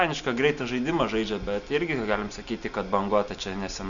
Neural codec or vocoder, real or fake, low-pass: none; real; 7.2 kHz